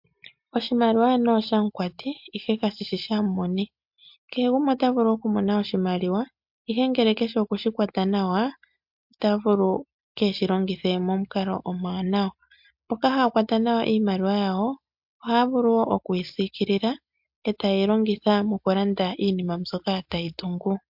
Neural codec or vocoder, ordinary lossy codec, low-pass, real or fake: none; MP3, 48 kbps; 5.4 kHz; real